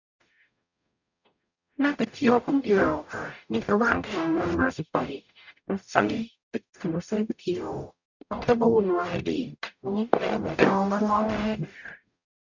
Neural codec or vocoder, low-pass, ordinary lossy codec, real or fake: codec, 44.1 kHz, 0.9 kbps, DAC; 7.2 kHz; none; fake